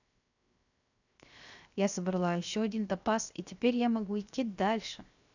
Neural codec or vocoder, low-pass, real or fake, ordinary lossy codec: codec, 16 kHz, 0.7 kbps, FocalCodec; 7.2 kHz; fake; none